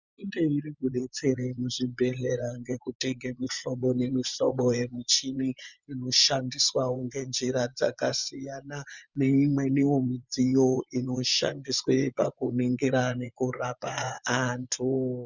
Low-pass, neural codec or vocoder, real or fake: 7.2 kHz; vocoder, 24 kHz, 100 mel bands, Vocos; fake